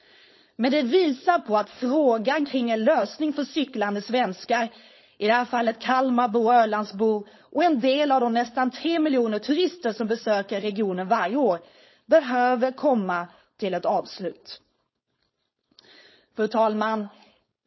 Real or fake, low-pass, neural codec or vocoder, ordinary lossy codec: fake; 7.2 kHz; codec, 16 kHz, 4.8 kbps, FACodec; MP3, 24 kbps